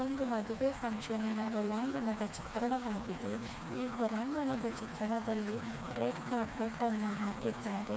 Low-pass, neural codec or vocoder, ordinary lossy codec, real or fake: none; codec, 16 kHz, 2 kbps, FreqCodec, smaller model; none; fake